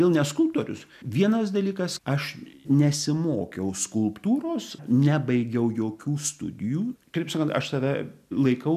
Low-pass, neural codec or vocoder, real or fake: 14.4 kHz; none; real